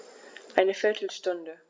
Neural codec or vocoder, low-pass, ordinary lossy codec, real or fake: none; none; none; real